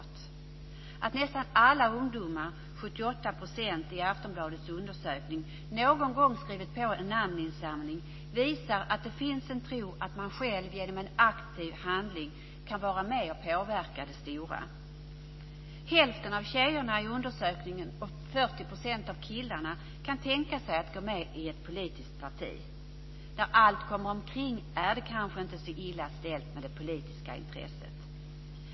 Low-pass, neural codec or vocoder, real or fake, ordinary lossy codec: 7.2 kHz; none; real; MP3, 24 kbps